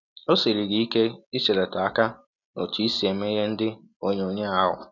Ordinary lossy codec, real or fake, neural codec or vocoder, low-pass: none; real; none; 7.2 kHz